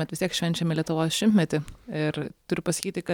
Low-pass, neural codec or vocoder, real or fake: 19.8 kHz; none; real